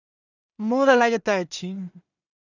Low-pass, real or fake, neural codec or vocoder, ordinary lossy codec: 7.2 kHz; fake; codec, 16 kHz in and 24 kHz out, 0.4 kbps, LongCat-Audio-Codec, two codebook decoder; none